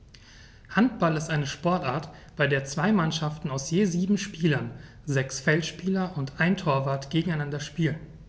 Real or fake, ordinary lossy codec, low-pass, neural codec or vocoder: real; none; none; none